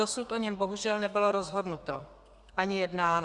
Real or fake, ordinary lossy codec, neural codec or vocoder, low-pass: fake; Opus, 64 kbps; codec, 32 kHz, 1.9 kbps, SNAC; 10.8 kHz